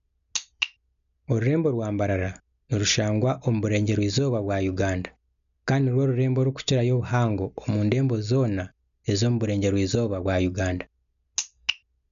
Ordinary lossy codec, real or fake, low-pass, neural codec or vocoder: none; real; 7.2 kHz; none